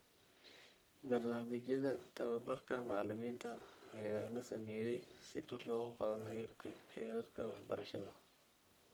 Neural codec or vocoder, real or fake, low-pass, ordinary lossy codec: codec, 44.1 kHz, 1.7 kbps, Pupu-Codec; fake; none; none